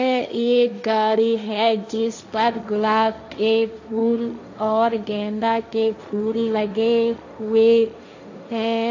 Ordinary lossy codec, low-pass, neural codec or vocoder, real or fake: AAC, 48 kbps; 7.2 kHz; codec, 16 kHz, 1.1 kbps, Voila-Tokenizer; fake